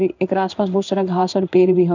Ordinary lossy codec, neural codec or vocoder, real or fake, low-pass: none; codec, 16 kHz in and 24 kHz out, 1 kbps, XY-Tokenizer; fake; 7.2 kHz